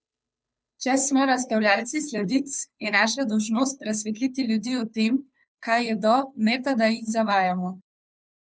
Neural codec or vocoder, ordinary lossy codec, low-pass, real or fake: codec, 16 kHz, 2 kbps, FunCodec, trained on Chinese and English, 25 frames a second; none; none; fake